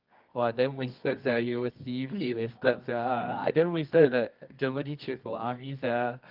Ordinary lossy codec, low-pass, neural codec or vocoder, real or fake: Opus, 32 kbps; 5.4 kHz; codec, 24 kHz, 0.9 kbps, WavTokenizer, medium music audio release; fake